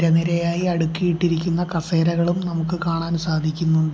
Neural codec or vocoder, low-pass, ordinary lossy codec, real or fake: none; none; none; real